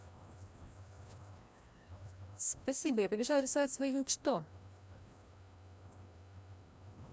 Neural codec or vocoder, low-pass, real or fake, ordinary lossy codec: codec, 16 kHz, 1 kbps, FreqCodec, larger model; none; fake; none